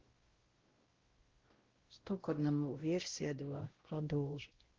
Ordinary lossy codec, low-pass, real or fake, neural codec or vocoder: Opus, 16 kbps; 7.2 kHz; fake; codec, 16 kHz, 0.5 kbps, X-Codec, WavLM features, trained on Multilingual LibriSpeech